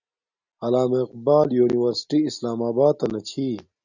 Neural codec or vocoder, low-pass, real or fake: none; 7.2 kHz; real